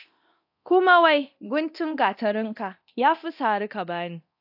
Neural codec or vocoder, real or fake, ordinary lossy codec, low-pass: codec, 24 kHz, 0.9 kbps, DualCodec; fake; AAC, 48 kbps; 5.4 kHz